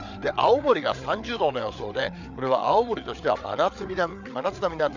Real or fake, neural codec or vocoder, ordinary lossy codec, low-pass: fake; codec, 16 kHz, 8 kbps, FreqCodec, larger model; none; 7.2 kHz